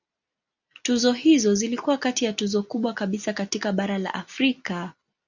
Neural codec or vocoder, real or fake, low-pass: none; real; 7.2 kHz